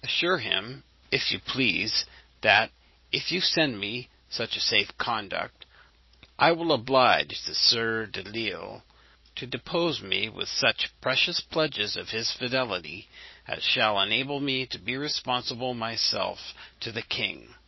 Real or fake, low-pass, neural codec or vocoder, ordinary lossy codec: fake; 7.2 kHz; vocoder, 44.1 kHz, 128 mel bands every 512 samples, BigVGAN v2; MP3, 24 kbps